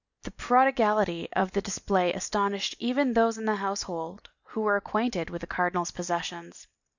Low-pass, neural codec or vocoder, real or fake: 7.2 kHz; none; real